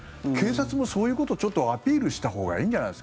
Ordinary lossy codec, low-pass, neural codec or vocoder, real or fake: none; none; none; real